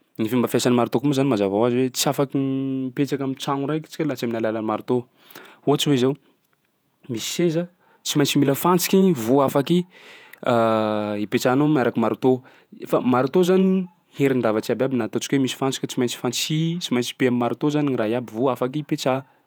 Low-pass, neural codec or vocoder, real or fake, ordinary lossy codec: none; vocoder, 48 kHz, 128 mel bands, Vocos; fake; none